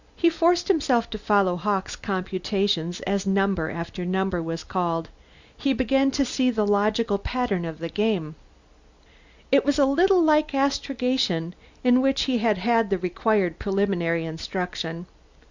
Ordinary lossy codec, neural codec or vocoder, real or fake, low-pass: Opus, 64 kbps; none; real; 7.2 kHz